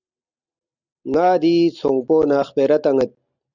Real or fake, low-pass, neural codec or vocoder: real; 7.2 kHz; none